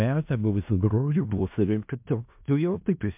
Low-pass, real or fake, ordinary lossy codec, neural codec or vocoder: 3.6 kHz; fake; MP3, 32 kbps; codec, 16 kHz in and 24 kHz out, 0.4 kbps, LongCat-Audio-Codec, four codebook decoder